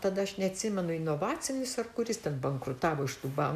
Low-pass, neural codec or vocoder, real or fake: 14.4 kHz; none; real